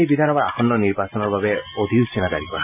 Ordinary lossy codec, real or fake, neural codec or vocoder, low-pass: none; real; none; 3.6 kHz